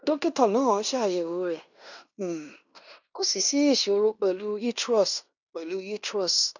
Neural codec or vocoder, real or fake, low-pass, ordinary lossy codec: codec, 16 kHz in and 24 kHz out, 0.9 kbps, LongCat-Audio-Codec, fine tuned four codebook decoder; fake; 7.2 kHz; none